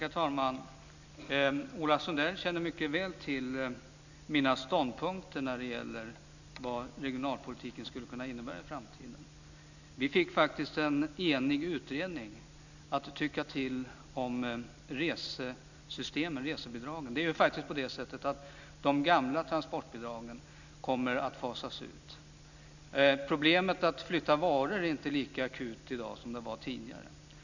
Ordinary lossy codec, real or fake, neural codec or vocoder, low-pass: none; real; none; 7.2 kHz